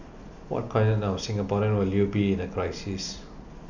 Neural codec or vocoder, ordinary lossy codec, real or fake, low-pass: none; none; real; 7.2 kHz